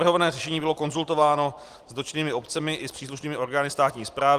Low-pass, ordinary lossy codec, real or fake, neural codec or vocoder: 14.4 kHz; Opus, 24 kbps; fake; vocoder, 44.1 kHz, 128 mel bands every 256 samples, BigVGAN v2